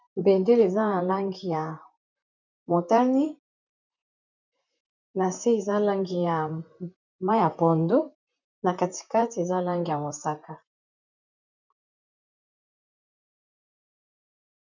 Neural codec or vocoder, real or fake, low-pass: vocoder, 44.1 kHz, 128 mel bands, Pupu-Vocoder; fake; 7.2 kHz